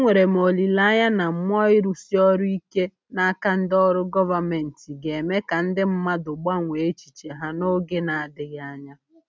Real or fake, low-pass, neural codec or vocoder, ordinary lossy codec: real; none; none; none